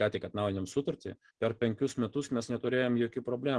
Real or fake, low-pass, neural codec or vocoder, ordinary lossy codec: real; 9.9 kHz; none; Opus, 16 kbps